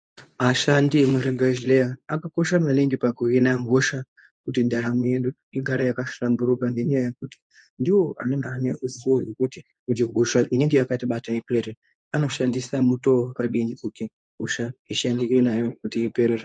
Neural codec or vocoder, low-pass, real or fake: codec, 24 kHz, 0.9 kbps, WavTokenizer, medium speech release version 2; 9.9 kHz; fake